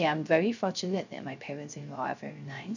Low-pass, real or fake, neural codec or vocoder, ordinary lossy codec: 7.2 kHz; fake; codec, 16 kHz, 0.3 kbps, FocalCodec; none